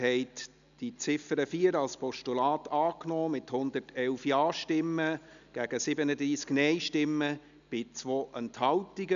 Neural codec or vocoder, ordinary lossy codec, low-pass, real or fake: none; none; 7.2 kHz; real